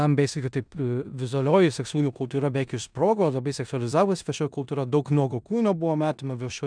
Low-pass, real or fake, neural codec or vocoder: 9.9 kHz; fake; codec, 16 kHz in and 24 kHz out, 0.9 kbps, LongCat-Audio-Codec, four codebook decoder